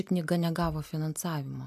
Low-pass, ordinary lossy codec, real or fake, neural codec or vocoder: 14.4 kHz; AAC, 96 kbps; real; none